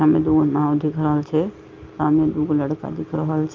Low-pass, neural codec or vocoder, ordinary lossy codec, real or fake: 7.2 kHz; none; Opus, 32 kbps; real